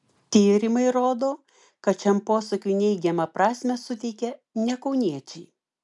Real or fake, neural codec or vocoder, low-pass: real; none; 10.8 kHz